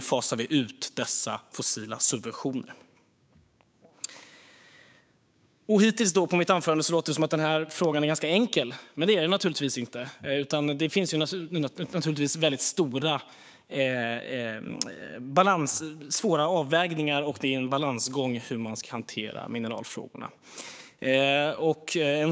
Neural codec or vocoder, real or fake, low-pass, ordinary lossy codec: codec, 16 kHz, 6 kbps, DAC; fake; none; none